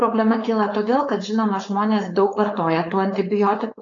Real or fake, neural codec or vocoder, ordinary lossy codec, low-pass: fake; codec, 16 kHz, 4.8 kbps, FACodec; AAC, 32 kbps; 7.2 kHz